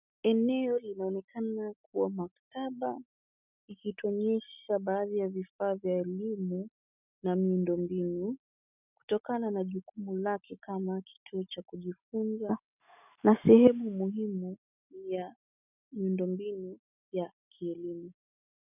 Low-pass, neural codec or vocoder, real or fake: 3.6 kHz; none; real